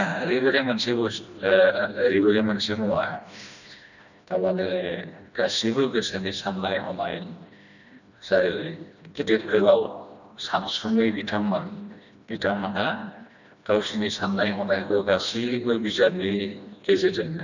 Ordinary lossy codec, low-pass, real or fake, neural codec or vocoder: none; 7.2 kHz; fake; codec, 16 kHz, 1 kbps, FreqCodec, smaller model